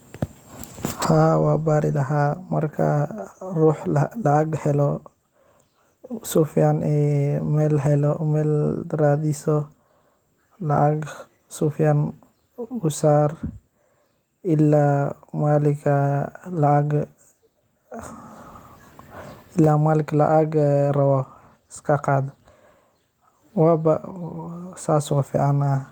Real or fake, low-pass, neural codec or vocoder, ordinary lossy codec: real; 19.8 kHz; none; Opus, 24 kbps